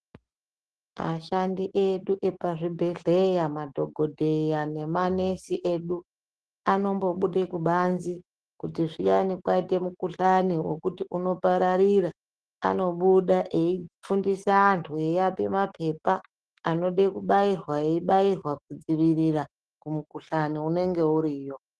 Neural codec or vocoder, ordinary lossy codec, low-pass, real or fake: autoencoder, 48 kHz, 128 numbers a frame, DAC-VAE, trained on Japanese speech; Opus, 16 kbps; 10.8 kHz; fake